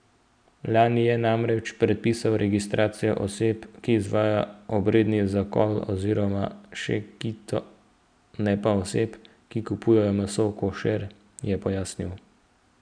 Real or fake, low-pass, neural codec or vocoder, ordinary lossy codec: real; 9.9 kHz; none; none